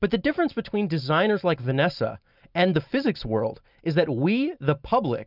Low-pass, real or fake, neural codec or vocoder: 5.4 kHz; real; none